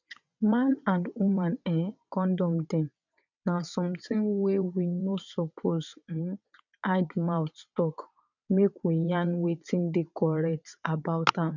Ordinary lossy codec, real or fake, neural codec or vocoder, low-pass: none; fake; vocoder, 22.05 kHz, 80 mel bands, WaveNeXt; 7.2 kHz